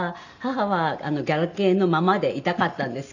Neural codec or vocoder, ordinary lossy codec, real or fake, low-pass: none; none; real; 7.2 kHz